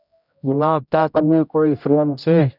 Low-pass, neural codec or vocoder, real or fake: 5.4 kHz; codec, 16 kHz, 0.5 kbps, X-Codec, HuBERT features, trained on general audio; fake